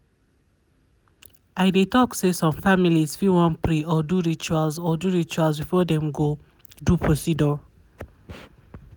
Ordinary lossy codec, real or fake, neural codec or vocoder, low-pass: none; real; none; none